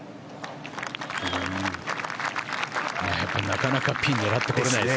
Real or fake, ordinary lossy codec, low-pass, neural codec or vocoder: real; none; none; none